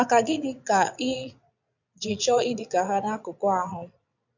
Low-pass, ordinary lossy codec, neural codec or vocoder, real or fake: 7.2 kHz; none; vocoder, 22.05 kHz, 80 mel bands, Vocos; fake